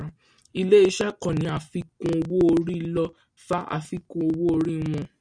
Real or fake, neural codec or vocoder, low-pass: real; none; 9.9 kHz